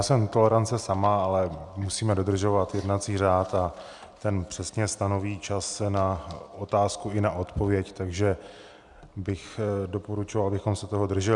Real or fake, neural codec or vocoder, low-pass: real; none; 10.8 kHz